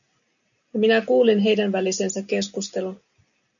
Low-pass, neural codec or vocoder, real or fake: 7.2 kHz; none; real